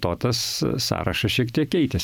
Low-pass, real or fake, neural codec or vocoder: 19.8 kHz; fake; vocoder, 44.1 kHz, 128 mel bands every 512 samples, BigVGAN v2